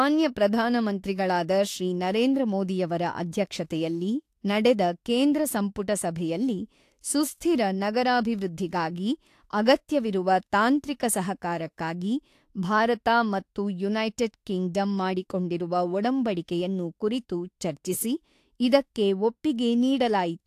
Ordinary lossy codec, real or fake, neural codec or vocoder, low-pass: AAC, 64 kbps; fake; autoencoder, 48 kHz, 32 numbers a frame, DAC-VAE, trained on Japanese speech; 14.4 kHz